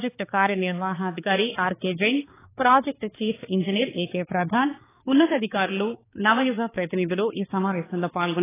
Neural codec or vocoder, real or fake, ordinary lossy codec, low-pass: codec, 16 kHz, 4 kbps, X-Codec, HuBERT features, trained on balanced general audio; fake; AAC, 16 kbps; 3.6 kHz